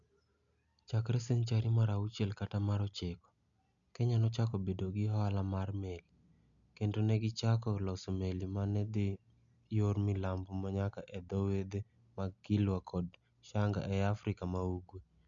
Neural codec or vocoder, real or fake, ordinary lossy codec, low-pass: none; real; none; 7.2 kHz